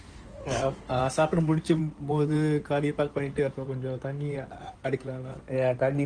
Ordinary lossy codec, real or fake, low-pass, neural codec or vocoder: Opus, 24 kbps; fake; 9.9 kHz; codec, 16 kHz in and 24 kHz out, 2.2 kbps, FireRedTTS-2 codec